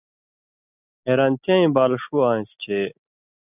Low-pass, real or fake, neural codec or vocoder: 3.6 kHz; real; none